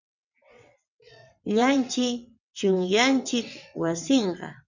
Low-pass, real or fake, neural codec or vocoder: 7.2 kHz; fake; vocoder, 22.05 kHz, 80 mel bands, WaveNeXt